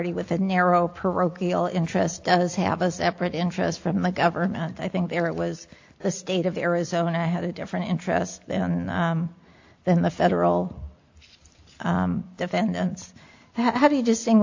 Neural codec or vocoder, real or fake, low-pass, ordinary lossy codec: none; real; 7.2 kHz; AAC, 48 kbps